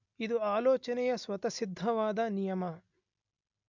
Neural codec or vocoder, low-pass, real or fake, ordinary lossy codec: none; 7.2 kHz; real; MP3, 64 kbps